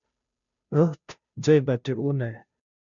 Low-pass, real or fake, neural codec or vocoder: 7.2 kHz; fake; codec, 16 kHz, 0.5 kbps, FunCodec, trained on Chinese and English, 25 frames a second